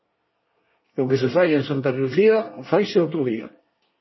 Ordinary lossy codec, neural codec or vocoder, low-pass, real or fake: MP3, 24 kbps; codec, 24 kHz, 1 kbps, SNAC; 7.2 kHz; fake